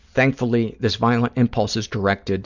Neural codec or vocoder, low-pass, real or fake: none; 7.2 kHz; real